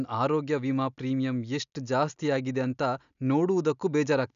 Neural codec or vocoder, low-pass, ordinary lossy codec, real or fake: none; 7.2 kHz; none; real